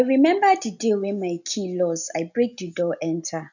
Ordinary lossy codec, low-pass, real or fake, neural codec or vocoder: none; 7.2 kHz; real; none